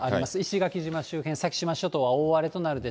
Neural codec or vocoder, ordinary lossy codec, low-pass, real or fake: none; none; none; real